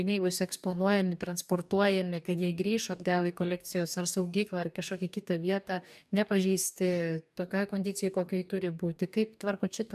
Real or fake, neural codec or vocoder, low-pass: fake; codec, 44.1 kHz, 2.6 kbps, DAC; 14.4 kHz